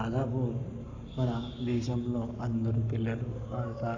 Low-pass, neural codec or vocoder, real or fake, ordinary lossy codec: 7.2 kHz; codec, 44.1 kHz, 7.8 kbps, Pupu-Codec; fake; none